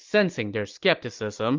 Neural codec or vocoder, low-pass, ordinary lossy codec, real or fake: none; 7.2 kHz; Opus, 24 kbps; real